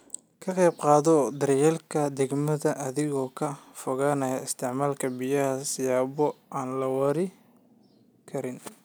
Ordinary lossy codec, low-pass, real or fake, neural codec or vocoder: none; none; real; none